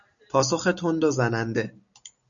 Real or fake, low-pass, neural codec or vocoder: real; 7.2 kHz; none